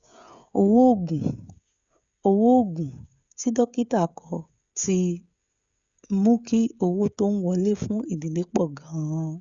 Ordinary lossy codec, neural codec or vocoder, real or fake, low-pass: Opus, 64 kbps; codec, 16 kHz, 16 kbps, FreqCodec, smaller model; fake; 7.2 kHz